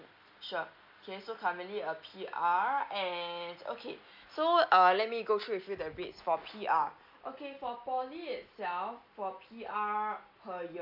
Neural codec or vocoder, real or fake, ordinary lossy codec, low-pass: none; real; MP3, 48 kbps; 5.4 kHz